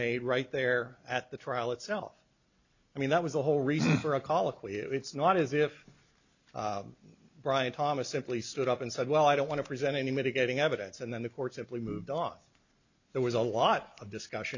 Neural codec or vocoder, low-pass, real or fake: none; 7.2 kHz; real